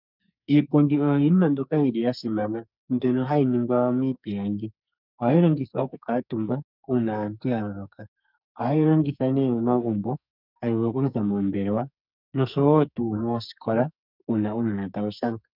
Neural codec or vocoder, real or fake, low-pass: codec, 44.1 kHz, 2.6 kbps, SNAC; fake; 5.4 kHz